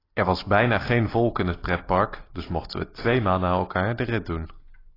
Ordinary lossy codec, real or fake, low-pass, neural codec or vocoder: AAC, 24 kbps; real; 5.4 kHz; none